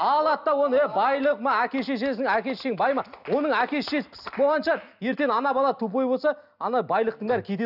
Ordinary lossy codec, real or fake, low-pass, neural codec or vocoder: none; real; 5.4 kHz; none